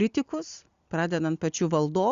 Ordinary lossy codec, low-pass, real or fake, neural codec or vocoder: Opus, 64 kbps; 7.2 kHz; real; none